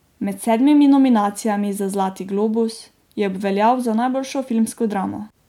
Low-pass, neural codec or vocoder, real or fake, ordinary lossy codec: 19.8 kHz; none; real; MP3, 96 kbps